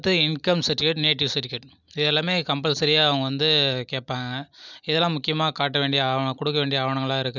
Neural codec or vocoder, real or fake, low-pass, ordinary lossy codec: none; real; 7.2 kHz; none